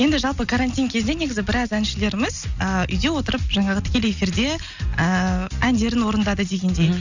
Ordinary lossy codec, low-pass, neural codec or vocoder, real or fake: none; 7.2 kHz; none; real